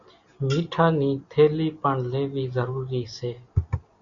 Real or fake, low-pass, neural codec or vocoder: real; 7.2 kHz; none